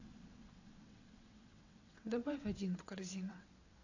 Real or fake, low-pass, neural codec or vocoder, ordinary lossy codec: fake; 7.2 kHz; vocoder, 22.05 kHz, 80 mel bands, WaveNeXt; AAC, 48 kbps